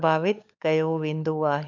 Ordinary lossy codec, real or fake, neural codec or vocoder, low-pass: MP3, 64 kbps; fake; codec, 16 kHz, 16 kbps, FunCodec, trained on LibriTTS, 50 frames a second; 7.2 kHz